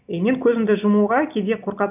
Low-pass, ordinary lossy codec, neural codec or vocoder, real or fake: 3.6 kHz; none; none; real